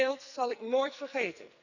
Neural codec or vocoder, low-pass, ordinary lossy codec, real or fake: codec, 44.1 kHz, 2.6 kbps, SNAC; 7.2 kHz; none; fake